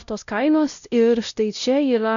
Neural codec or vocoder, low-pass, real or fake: codec, 16 kHz, 0.5 kbps, X-Codec, WavLM features, trained on Multilingual LibriSpeech; 7.2 kHz; fake